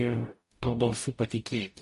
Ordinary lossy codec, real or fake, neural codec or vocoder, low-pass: MP3, 48 kbps; fake; codec, 44.1 kHz, 0.9 kbps, DAC; 14.4 kHz